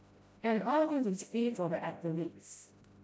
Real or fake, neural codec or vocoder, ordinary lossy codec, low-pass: fake; codec, 16 kHz, 0.5 kbps, FreqCodec, smaller model; none; none